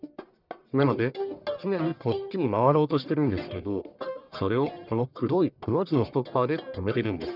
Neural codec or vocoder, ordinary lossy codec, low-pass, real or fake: codec, 44.1 kHz, 1.7 kbps, Pupu-Codec; none; 5.4 kHz; fake